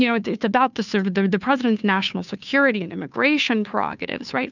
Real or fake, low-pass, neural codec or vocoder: fake; 7.2 kHz; codec, 16 kHz, 2 kbps, FunCodec, trained on Chinese and English, 25 frames a second